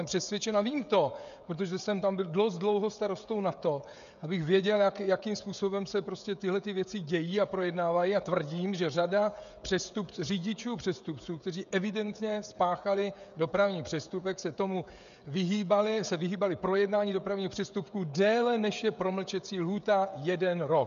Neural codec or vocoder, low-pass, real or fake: codec, 16 kHz, 16 kbps, FreqCodec, smaller model; 7.2 kHz; fake